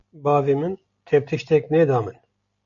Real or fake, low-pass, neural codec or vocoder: real; 7.2 kHz; none